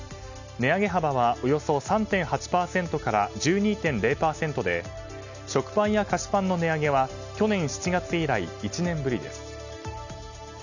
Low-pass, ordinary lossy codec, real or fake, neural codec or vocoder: 7.2 kHz; none; real; none